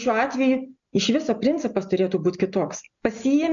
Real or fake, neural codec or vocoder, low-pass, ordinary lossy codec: real; none; 7.2 kHz; MP3, 96 kbps